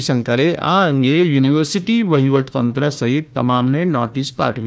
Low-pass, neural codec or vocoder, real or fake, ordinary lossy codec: none; codec, 16 kHz, 1 kbps, FunCodec, trained on Chinese and English, 50 frames a second; fake; none